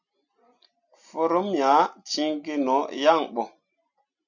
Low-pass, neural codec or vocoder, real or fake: 7.2 kHz; none; real